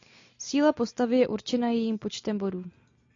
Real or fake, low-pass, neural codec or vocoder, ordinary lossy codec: real; 7.2 kHz; none; AAC, 48 kbps